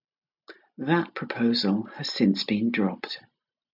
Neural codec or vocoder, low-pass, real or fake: none; 5.4 kHz; real